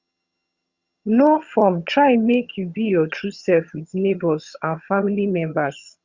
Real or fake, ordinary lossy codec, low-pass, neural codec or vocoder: fake; Opus, 64 kbps; 7.2 kHz; vocoder, 22.05 kHz, 80 mel bands, HiFi-GAN